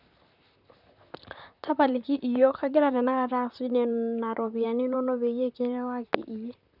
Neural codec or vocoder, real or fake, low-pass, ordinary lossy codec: vocoder, 44.1 kHz, 128 mel bands, Pupu-Vocoder; fake; 5.4 kHz; none